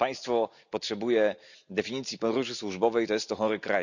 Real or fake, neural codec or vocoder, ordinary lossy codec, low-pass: real; none; none; 7.2 kHz